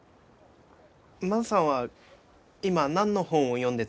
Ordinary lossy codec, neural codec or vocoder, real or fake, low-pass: none; none; real; none